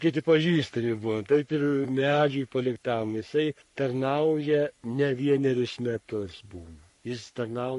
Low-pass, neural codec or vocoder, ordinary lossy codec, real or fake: 14.4 kHz; codec, 44.1 kHz, 3.4 kbps, Pupu-Codec; MP3, 48 kbps; fake